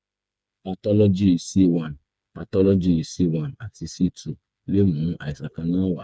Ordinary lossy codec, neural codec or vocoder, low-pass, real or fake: none; codec, 16 kHz, 4 kbps, FreqCodec, smaller model; none; fake